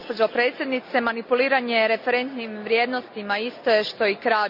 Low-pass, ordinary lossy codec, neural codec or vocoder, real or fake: 5.4 kHz; none; none; real